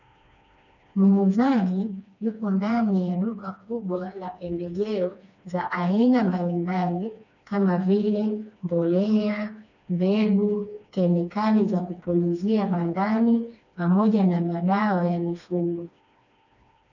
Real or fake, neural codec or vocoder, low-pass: fake; codec, 16 kHz, 2 kbps, FreqCodec, smaller model; 7.2 kHz